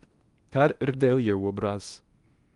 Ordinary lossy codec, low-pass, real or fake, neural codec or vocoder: Opus, 32 kbps; 10.8 kHz; fake; codec, 16 kHz in and 24 kHz out, 0.8 kbps, FocalCodec, streaming, 65536 codes